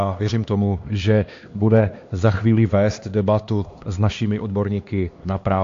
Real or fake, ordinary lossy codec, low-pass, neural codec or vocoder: fake; AAC, 48 kbps; 7.2 kHz; codec, 16 kHz, 2 kbps, X-Codec, HuBERT features, trained on LibriSpeech